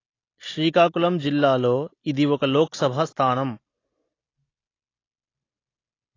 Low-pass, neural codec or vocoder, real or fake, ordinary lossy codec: 7.2 kHz; none; real; AAC, 32 kbps